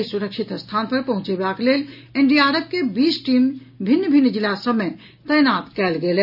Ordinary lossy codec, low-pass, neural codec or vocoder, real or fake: none; 5.4 kHz; none; real